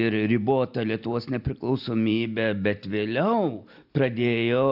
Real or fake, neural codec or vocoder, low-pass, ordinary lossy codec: real; none; 5.4 kHz; AAC, 48 kbps